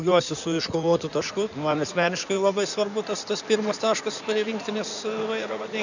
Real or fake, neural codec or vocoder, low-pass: fake; codec, 16 kHz in and 24 kHz out, 2.2 kbps, FireRedTTS-2 codec; 7.2 kHz